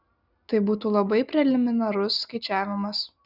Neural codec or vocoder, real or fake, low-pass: none; real; 5.4 kHz